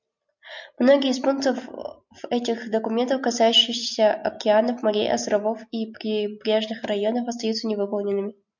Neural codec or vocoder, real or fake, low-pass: none; real; 7.2 kHz